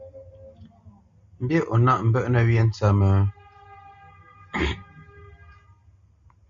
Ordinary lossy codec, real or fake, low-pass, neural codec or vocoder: Opus, 64 kbps; real; 7.2 kHz; none